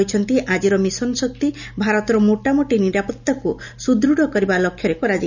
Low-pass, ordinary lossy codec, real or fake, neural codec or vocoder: 7.2 kHz; none; real; none